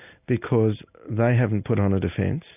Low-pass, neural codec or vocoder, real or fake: 3.6 kHz; none; real